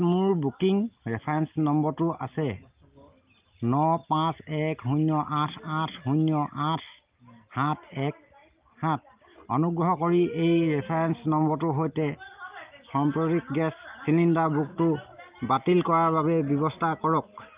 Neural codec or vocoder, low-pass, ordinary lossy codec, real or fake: none; 3.6 kHz; Opus, 32 kbps; real